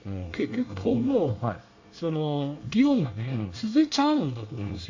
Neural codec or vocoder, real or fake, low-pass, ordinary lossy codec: codec, 24 kHz, 1 kbps, SNAC; fake; 7.2 kHz; AAC, 48 kbps